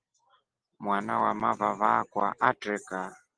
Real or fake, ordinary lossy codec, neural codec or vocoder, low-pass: real; Opus, 16 kbps; none; 9.9 kHz